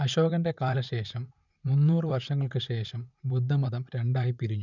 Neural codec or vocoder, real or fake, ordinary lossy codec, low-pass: vocoder, 44.1 kHz, 128 mel bands, Pupu-Vocoder; fake; none; 7.2 kHz